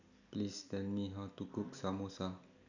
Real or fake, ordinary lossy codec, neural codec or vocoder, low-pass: real; none; none; 7.2 kHz